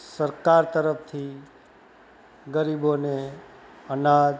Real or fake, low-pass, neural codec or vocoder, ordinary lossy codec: real; none; none; none